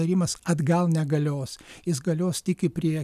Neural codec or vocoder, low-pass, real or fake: none; 14.4 kHz; real